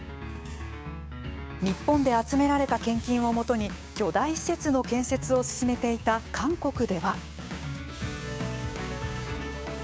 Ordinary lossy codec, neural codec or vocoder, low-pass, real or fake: none; codec, 16 kHz, 6 kbps, DAC; none; fake